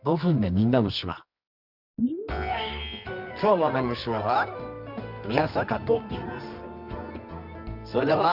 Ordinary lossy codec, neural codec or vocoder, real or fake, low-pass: none; codec, 24 kHz, 0.9 kbps, WavTokenizer, medium music audio release; fake; 5.4 kHz